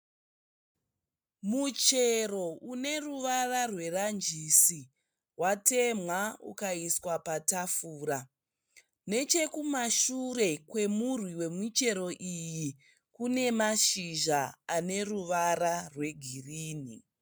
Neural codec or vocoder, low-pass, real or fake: none; 19.8 kHz; real